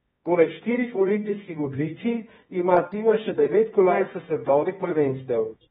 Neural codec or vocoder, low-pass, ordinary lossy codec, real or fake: codec, 24 kHz, 0.9 kbps, WavTokenizer, medium music audio release; 10.8 kHz; AAC, 16 kbps; fake